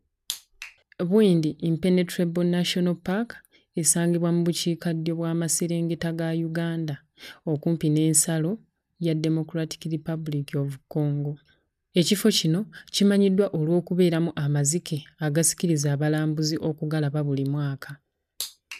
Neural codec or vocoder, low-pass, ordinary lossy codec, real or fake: none; 14.4 kHz; none; real